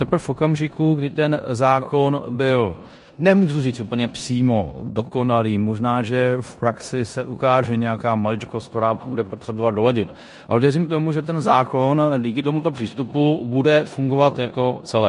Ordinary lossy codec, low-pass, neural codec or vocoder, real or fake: MP3, 48 kbps; 10.8 kHz; codec, 16 kHz in and 24 kHz out, 0.9 kbps, LongCat-Audio-Codec, four codebook decoder; fake